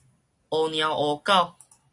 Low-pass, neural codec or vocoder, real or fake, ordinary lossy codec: 10.8 kHz; none; real; AAC, 48 kbps